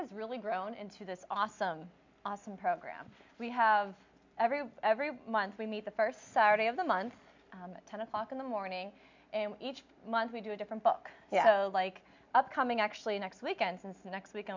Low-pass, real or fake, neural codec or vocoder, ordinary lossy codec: 7.2 kHz; real; none; MP3, 64 kbps